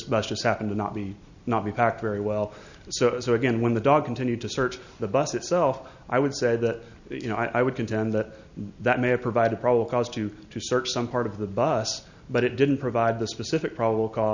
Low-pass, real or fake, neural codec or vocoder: 7.2 kHz; real; none